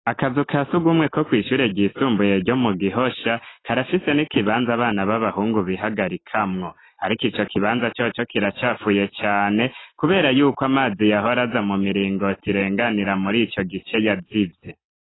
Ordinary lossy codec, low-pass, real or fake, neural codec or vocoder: AAC, 16 kbps; 7.2 kHz; real; none